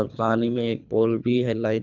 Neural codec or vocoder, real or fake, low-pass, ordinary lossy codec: codec, 24 kHz, 3 kbps, HILCodec; fake; 7.2 kHz; none